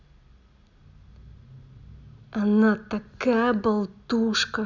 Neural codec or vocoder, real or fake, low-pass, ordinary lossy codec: none; real; 7.2 kHz; none